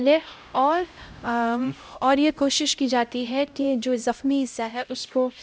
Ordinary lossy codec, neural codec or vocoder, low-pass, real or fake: none; codec, 16 kHz, 0.5 kbps, X-Codec, HuBERT features, trained on LibriSpeech; none; fake